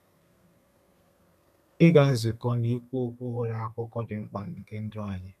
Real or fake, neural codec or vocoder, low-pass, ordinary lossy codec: fake; codec, 32 kHz, 1.9 kbps, SNAC; 14.4 kHz; none